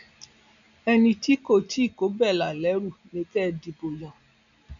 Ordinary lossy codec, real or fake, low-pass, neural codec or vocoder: none; real; 7.2 kHz; none